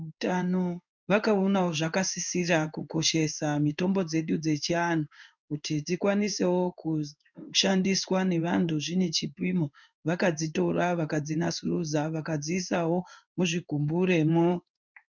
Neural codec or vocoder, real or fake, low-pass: codec, 16 kHz in and 24 kHz out, 1 kbps, XY-Tokenizer; fake; 7.2 kHz